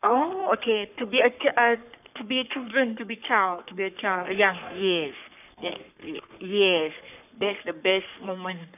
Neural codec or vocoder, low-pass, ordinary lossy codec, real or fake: codec, 44.1 kHz, 3.4 kbps, Pupu-Codec; 3.6 kHz; none; fake